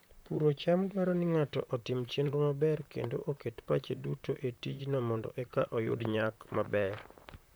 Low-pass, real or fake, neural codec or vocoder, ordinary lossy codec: none; fake; vocoder, 44.1 kHz, 128 mel bands, Pupu-Vocoder; none